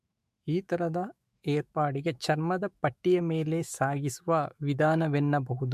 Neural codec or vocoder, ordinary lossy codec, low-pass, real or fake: autoencoder, 48 kHz, 128 numbers a frame, DAC-VAE, trained on Japanese speech; MP3, 64 kbps; 14.4 kHz; fake